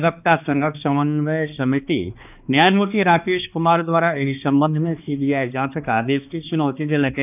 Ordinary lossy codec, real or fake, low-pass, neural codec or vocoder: none; fake; 3.6 kHz; codec, 16 kHz, 2 kbps, X-Codec, HuBERT features, trained on balanced general audio